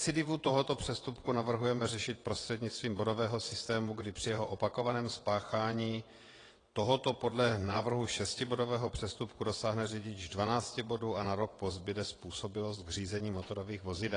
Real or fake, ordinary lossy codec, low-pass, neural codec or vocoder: fake; AAC, 32 kbps; 9.9 kHz; vocoder, 22.05 kHz, 80 mel bands, WaveNeXt